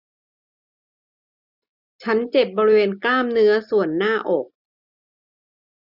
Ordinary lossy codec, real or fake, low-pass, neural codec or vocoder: none; real; 5.4 kHz; none